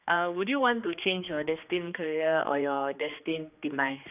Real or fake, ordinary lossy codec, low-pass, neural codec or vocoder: fake; none; 3.6 kHz; codec, 16 kHz, 2 kbps, X-Codec, HuBERT features, trained on general audio